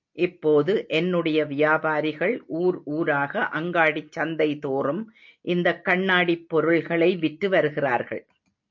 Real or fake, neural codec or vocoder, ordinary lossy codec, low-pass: real; none; MP3, 48 kbps; 7.2 kHz